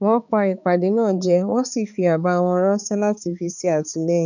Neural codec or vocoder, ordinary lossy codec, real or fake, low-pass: codec, 16 kHz, 4 kbps, X-Codec, HuBERT features, trained on balanced general audio; none; fake; 7.2 kHz